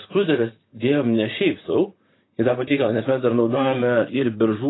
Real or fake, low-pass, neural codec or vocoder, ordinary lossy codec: fake; 7.2 kHz; vocoder, 44.1 kHz, 128 mel bands, Pupu-Vocoder; AAC, 16 kbps